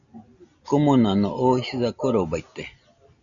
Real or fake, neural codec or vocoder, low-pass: real; none; 7.2 kHz